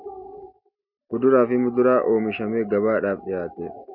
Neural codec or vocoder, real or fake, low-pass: none; real; 5.4 kHz